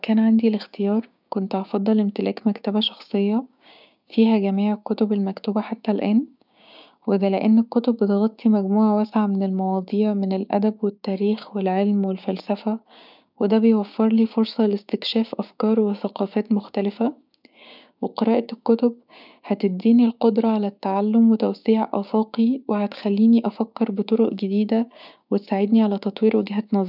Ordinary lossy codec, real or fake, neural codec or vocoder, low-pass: none; fake; codec, 16 kHz, 6 kbps, DAC; 5.4 kHz